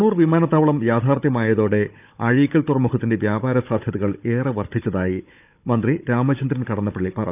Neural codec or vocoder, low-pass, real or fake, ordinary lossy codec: codec, 16 kHz, 16 kbps, FunCodec, trained on Chinese and English, 50 frames a second; 3.6 kHz; fake; none